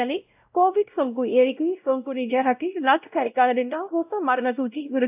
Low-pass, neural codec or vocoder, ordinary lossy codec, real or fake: 3.6 kHz; codec, 16 kHz, 0.5 kbps, X-Codec, WavLM features, trained on Multilingual LibriSpeech; AAC, 32 kbps; fake